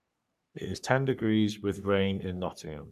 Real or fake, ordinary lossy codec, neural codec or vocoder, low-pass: fake; none; codec, 44.1 kHz, 3.4 kbps, Pupu-Codec; 14.4 kHz